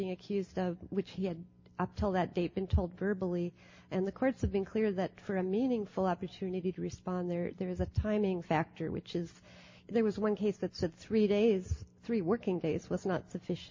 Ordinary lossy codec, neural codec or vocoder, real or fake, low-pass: MP3, 32 kbps; none; real; 7.2 kHz